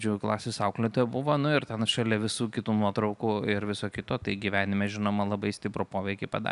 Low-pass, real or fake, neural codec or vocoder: 10.8 kHz; real; none